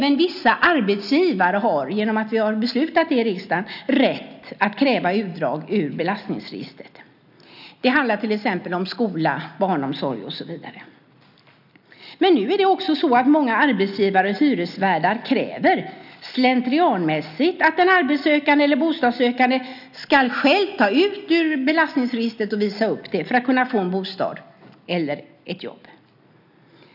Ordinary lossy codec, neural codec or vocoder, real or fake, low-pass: none; none; real; 5.4 kHz